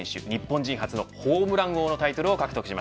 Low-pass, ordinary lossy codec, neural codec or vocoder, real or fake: none; none; none; real